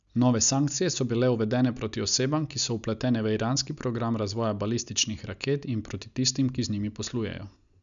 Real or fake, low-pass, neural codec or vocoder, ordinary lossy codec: real; 7.2 kHz; none; none